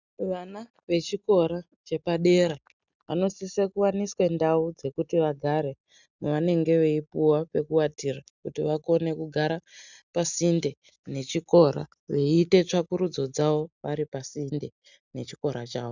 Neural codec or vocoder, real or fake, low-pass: none; real; 7.2 kHz